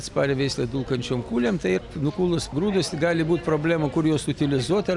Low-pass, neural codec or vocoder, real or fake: 10.8 kHz; none; real